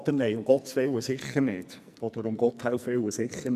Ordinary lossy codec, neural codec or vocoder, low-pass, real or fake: none; codec, 44.1 kHz, 2.6 kbps, SNAC; 14.4 kHz; fake